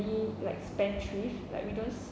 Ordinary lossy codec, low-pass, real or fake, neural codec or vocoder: none; none; real; none